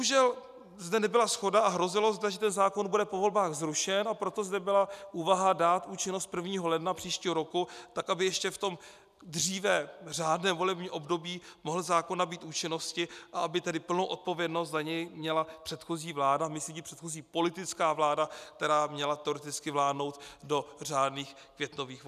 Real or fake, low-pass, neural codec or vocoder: real; 14.4 kHz; none